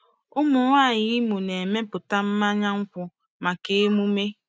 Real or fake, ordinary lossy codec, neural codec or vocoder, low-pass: real; none; none; none